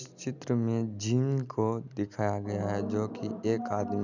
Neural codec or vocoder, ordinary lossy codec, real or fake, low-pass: none; none; real; 7.2 kHz